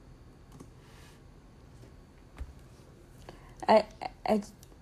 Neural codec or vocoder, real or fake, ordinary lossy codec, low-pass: none; real; AAC, 64 kbps; 14.4 kHz